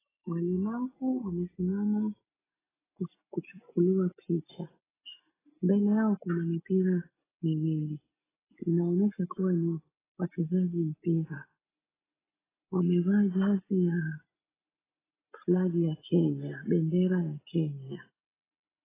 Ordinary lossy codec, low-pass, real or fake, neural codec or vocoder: AAC, 16 kbps; 3.6 kHz; real; none